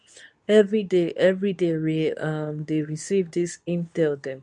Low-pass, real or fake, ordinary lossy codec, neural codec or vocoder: 10.8 kHz; fake; none; codec, 24 kHz, 0.9 kbps, WavTokenizer, medium speech release version 2